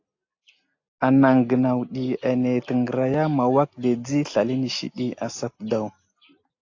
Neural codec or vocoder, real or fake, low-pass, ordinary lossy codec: none; real; 7.2 kHz; AAC, 48 kbps